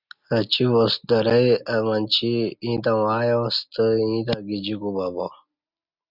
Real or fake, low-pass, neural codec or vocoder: real; 5.4 kHz; none